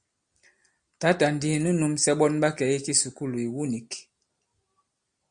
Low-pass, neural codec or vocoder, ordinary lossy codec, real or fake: 9.9 kHz; none; Opus, 64 kbps; real